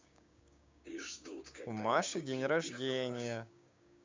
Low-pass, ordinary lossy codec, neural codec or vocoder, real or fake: 7.2 kHz; MP3, 64 kbps; codec, 16 kHz, 6 kbps, DAC; fake